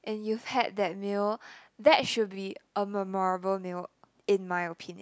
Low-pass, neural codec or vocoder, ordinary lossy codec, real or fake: none; none; none; real